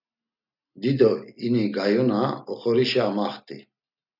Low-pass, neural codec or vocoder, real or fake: 5.4 kHz; none; real